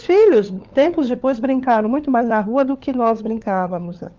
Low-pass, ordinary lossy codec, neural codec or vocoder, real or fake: 7.2 kHz; Opus, 32 kbps; codec, 16 kHz, 4 kbps, FunCodec, trained on LibriTTS, 50 frames a second; fake